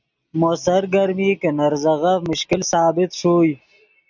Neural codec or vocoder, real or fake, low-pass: none; real; 7.2 kHz